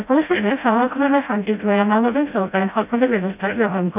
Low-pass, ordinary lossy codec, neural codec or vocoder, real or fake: 3.6 kHz; none; codec, 16 kHz, 0.5 kbps, FreqCodec, smaller model; fake